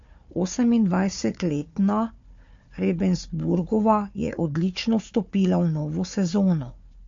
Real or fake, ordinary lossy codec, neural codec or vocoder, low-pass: fake; MP3, 48 kbps; codec, 16 kHz, 4 kbps, FunCodec, trained on Chinese and English, 50 frames a second; 7.2 kHz